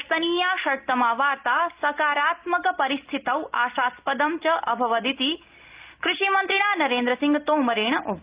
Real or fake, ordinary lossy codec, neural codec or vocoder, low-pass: real; Opus, 32 kbps; none; 3.6 kHz